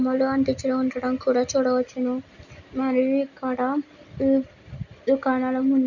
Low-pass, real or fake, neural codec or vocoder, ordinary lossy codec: 7.2 kHz; real; none; none